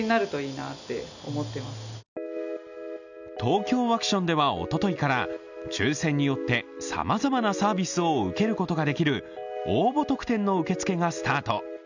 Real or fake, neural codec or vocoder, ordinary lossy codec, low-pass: real; none; none; 7.2 kHz